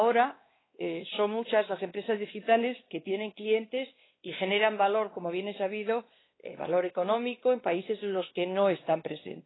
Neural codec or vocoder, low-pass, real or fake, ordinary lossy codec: codec, 16 kHz, 2 kbps, X-Codec, WavLM features, trained on Multilingual LibriSpeech; 7.2 kHz; fake; AAC, 16 kbps